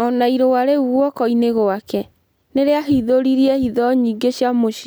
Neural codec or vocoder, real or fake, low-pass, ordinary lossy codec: none; real; none; none